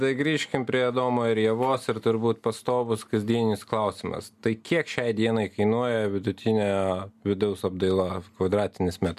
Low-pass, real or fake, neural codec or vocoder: 14.4 kHz; real; none